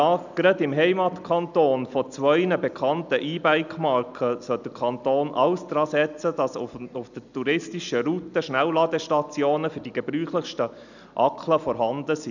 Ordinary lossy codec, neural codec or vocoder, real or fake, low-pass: none; none; real; 7.2 kHz